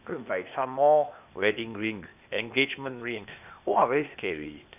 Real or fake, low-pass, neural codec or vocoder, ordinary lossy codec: fake; 3.6 kHz; codec, 16 kHz, 0.8 kbps, ZipCodec; none